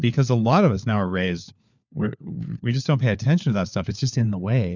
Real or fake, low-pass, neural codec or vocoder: fake; 7.2 kHz; codec, 16 kHz, 4 kbps, FunCodec, trained on LibriTTS, 50 frames a second